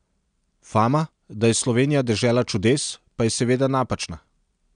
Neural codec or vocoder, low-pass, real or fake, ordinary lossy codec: none; 9.9 kHz; real; none